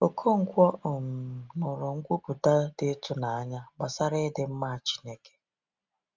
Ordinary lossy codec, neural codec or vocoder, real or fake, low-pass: Opus, 32 kbps; none; real; 7.2 kHz